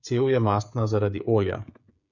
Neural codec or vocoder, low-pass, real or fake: codec, 16 kHz, 16 kbps, FreqCodec, smaller model; 7.2 kHz; fake